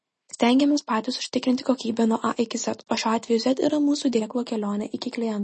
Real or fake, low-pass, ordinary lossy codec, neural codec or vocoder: real; 9.9 kHz; MP3, 32 kbps; none